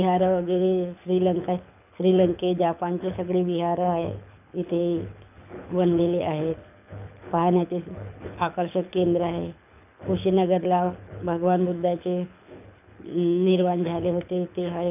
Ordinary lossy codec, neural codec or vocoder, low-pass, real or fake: none; codec, 24 kHz, 6 kbps, HILCodec; 3.6 kHz; fake